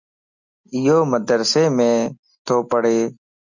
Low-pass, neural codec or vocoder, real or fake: 7.2 kHz; none; real